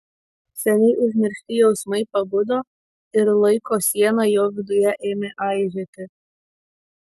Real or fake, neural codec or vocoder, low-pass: real; none; 14.4 kHz